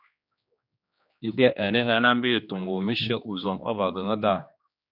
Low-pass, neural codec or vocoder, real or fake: 5.4 kHz; codec, 16 kHz, 2 kbps, X-Codec, HuBERT features, trained on general audio; fake